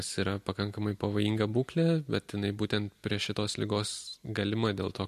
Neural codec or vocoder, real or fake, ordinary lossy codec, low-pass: none; real; MP3, 64 kbps; 14.4 kHz